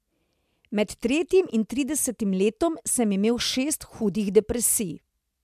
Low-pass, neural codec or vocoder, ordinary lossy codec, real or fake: 14.4 kHz; none; MP3, 96 kbps; real